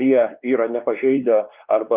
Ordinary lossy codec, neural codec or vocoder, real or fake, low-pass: Opus, 24 kbps; codec, 16 kHz, 2 kbps, X-Codec, WavLM features, trained on Multilingual LibriSpeech; fake; 3.6 kHz